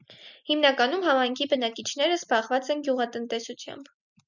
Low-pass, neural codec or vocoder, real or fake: 7.2 kHz; none; real